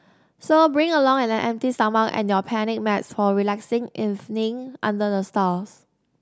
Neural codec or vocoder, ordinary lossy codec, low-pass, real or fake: none; none; none; real